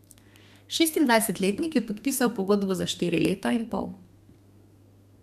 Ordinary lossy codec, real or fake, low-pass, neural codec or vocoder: none; fake; 14.4 kHz; codec, 32 kHz, 1.9 kbps, SNAC